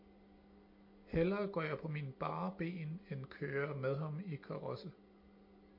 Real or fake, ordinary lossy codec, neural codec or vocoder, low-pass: real; MP3, 32 kbps; none; 5.4 kHz